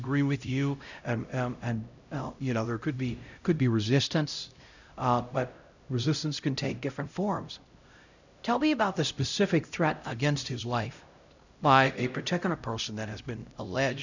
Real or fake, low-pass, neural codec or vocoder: fake; 7.2 kHz; codec, 16 kHz, 0.5 kbps, X-Codec, HuBERT features, trained on LibriSpeech